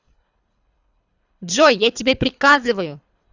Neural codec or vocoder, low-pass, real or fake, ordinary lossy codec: codec, 24 kHz, 3 kbps, HILCodec; 7.2 kHz; fake; Opus, 64 kbps